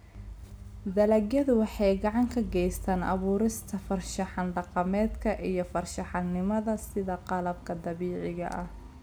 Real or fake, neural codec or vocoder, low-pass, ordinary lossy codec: real; none; none; none